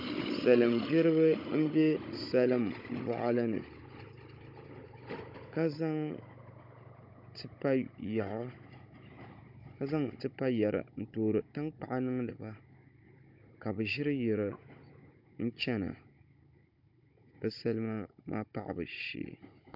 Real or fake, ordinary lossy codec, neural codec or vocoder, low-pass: fake; MP3, 48 kbps; codec, 16 kHz, 16 kbps, FunCodec, trained on Chinese and English, 50 frames a second; 5.4 kHz